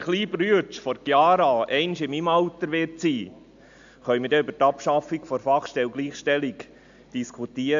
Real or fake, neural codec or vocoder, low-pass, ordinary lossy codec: real; none; 7.2 kHz; AAC, 64 kbps